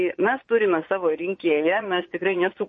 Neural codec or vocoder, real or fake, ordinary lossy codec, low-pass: vocoder, 22.05 kHz, 80 mel bands, Vocos; fake; MP3, 32 kbps; 9.9 kHz